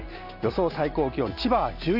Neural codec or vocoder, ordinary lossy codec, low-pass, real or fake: none; none; 5.4 kHz; real